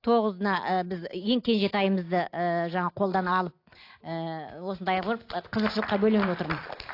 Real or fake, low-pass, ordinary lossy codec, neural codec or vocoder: real; 5.4 kHz; AAC, 32 kbps; none